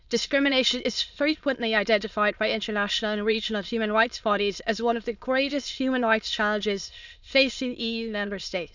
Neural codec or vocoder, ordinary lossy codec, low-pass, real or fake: autoencoder, 22.05 kHz, a latent of 192 numbers a frame, VITS, trained on many speakers; none; 7.2 kHz; fake